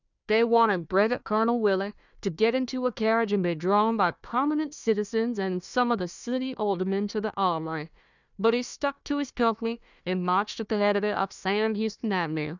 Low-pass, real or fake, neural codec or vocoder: 7.2 kHz; fake; codec, 16 kHz, 1 kbps, FunCodec, trained on Chinese and English, 50 frames a second